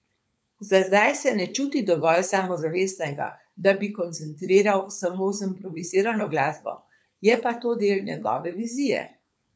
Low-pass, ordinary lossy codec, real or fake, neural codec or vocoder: none; none; fake; codec, 16 kHz, 4.8 kbps, FACodec